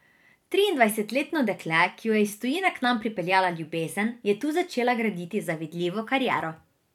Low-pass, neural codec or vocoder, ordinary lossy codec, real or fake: 19.8 kHz; vocoder, 44.1 kHz, 128 mel bands every 256 samples, BigVGAN v2; none; fake